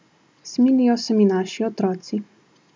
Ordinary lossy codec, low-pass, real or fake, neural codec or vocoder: none; none; real; none